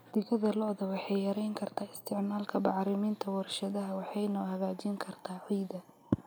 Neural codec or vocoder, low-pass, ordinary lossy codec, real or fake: none; none; none; real